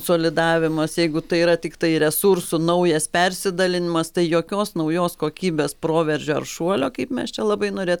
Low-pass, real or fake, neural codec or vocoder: 19.8 kHz; real; none